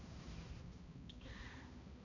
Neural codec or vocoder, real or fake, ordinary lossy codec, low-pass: codec, 16 kHz, 1 kbps, X-Codec, HuBERT features, trained on balanced general audio; fake; none; 7.2 kHz